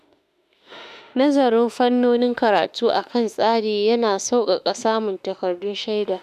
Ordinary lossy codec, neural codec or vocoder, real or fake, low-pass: none; autoencoder, 48 kHz, 32 numbers a frame, DAC-VAE, trained on Japanese speech; fake; 14.4 kHz